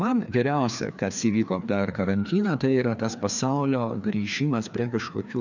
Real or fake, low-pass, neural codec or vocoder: fake; 7.2 kHz; codec, 16 kHz, 2 kbps, FreqCodec, larger model